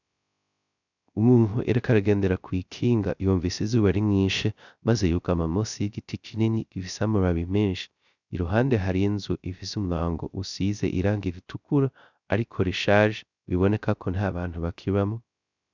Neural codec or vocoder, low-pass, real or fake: codec, 16 kHz, 0.3 kbps, FocalCodec; 7.2 kHz; fake